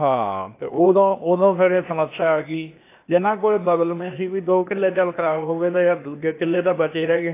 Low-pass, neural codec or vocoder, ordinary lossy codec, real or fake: 3.6 kHz; codec, 16 kHz, 0.8 kbps, ZipCodec; AAC, 24 kbps; fake